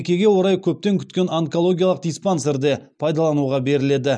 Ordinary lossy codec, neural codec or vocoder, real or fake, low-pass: none; none; real; 9.9 kHz